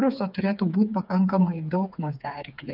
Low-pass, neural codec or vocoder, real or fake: 5.4 kHz; codec, 16 kHz, 4 kbps, FreqCodec, smaller model; fake